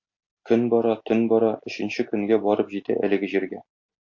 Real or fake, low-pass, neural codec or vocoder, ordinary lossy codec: real; 7.2 kHz; none; MP3, 48 kbps